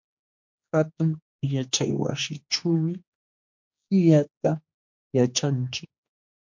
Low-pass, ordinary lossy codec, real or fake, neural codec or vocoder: 7.2 kHz; MP3, 48 kbps; fake; codec, 16 kHz, 2 kbps, X-Codec, HuBERT features, trained on general audio